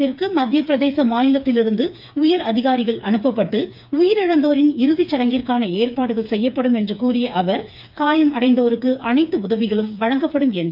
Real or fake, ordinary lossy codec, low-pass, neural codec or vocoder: fake; none; 5.4 kHz; codec, 16 kHz, 4 kbps, FreqCodec, smaller model